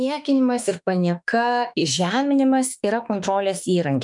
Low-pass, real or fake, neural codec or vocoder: 10.8 kHz; fake; autoencoder, 48 kHz, 32 numbers a frame, DAC-VAE, trained on Japanese speech